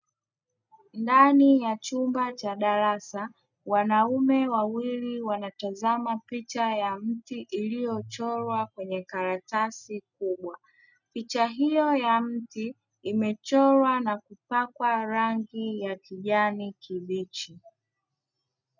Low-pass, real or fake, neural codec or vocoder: 7.2 kHz; real; none